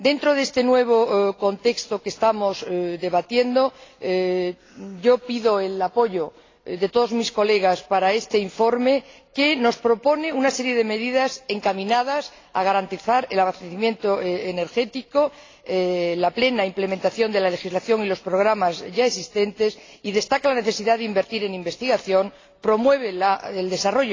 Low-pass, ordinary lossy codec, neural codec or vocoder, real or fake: 7.2 kHz; AAC, 32 kbps; none; real